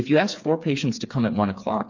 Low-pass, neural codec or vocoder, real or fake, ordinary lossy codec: 7.2 kHz; codec, 16 kHz, 4 kbps, FreqCodec, smaller model; fake; MP3, 48 kbps